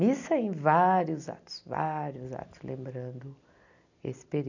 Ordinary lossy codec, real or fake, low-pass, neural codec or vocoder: none; real; 7.2 kHz; none